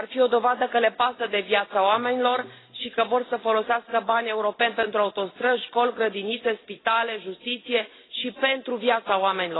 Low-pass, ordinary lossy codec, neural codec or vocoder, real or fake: 7.2 kHz; AAC, 16 kbps; none; real